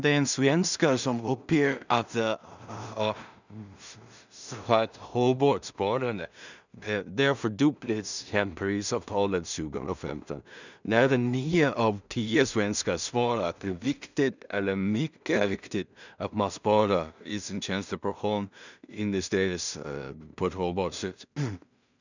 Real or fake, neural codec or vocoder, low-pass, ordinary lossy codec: fake; codec, 16 kHz in and 24 kHz out, 0.4 kbps, LongCat-Audio-Codec, two codebook decoder; 7.2 kHz; none